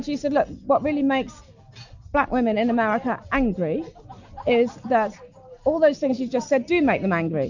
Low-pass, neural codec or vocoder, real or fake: 7.2 kHz; none; real